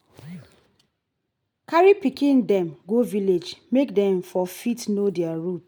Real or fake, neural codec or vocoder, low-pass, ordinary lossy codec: real; none; none; none